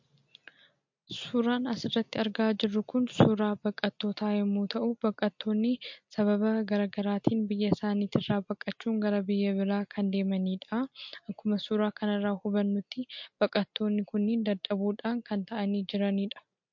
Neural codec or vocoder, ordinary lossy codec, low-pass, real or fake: none; MP3, 48 kbps; 7.2 kHz; real